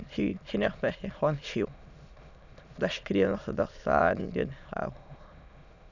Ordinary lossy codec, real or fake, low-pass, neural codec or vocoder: none; fake; 7.2 kHz; autoencoder, 22.05 kHz, a latent of 192 numbers a frame, VITS, trained on many speakers